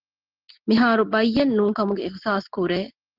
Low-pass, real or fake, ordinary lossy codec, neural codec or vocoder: 5.4 kHz; real; Opus, 16 kbps; none